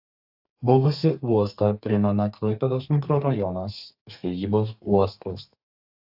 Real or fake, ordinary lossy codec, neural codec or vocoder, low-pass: fake; AAC, 48 kbps; codec, 32 kHz, 1.9 kbps, SNAC; 5.4 kHz